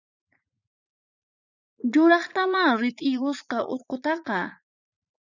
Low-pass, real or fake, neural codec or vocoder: 7.2 kHz; fake; vocoder, 44.1 kHz, 80 mel bands, Vocos